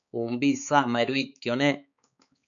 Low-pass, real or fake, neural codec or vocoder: 7.2 kHz; fake; codec, 16 kHz, 4 kbps, X-Codec, HuBERT features, trained on balanced general audio